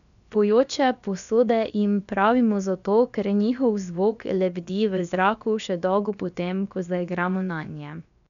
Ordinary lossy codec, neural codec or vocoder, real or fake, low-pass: none; codec, 16 kHz, about 1 kbps, DyCAST, with the encoder's durations; fake; 7.2 kHz